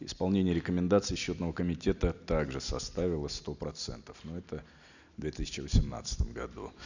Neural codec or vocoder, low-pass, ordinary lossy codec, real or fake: none; 7.2 kHz; none; real